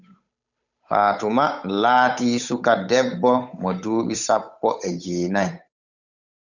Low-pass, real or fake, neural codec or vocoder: 7.2 kHz; fake; codec, 16 kHz, 8 kbps, FunCodec, trained on Chinese and English, 25 frames a second